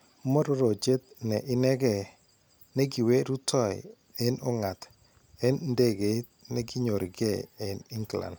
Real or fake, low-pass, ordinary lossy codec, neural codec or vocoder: real; none; none; none